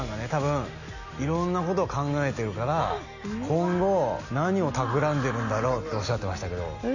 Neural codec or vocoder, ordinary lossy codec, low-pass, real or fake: none; none; 7.2 kHz; real